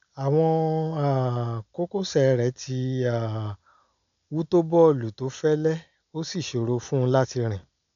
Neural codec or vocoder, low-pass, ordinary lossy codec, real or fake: none; 7.2 kHz; none; real